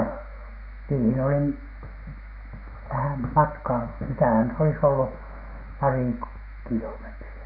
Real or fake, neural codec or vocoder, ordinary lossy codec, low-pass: fake; autoencoder, 48 kHz, 128 numbers a frame, DAC-VAE, trained on Japanese speech; none; 5.4 kHz